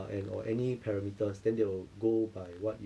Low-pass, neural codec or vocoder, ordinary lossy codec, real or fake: none; none; none; real